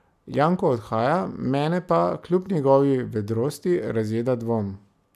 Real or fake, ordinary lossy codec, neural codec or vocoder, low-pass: real; none; none; 14.4 kHz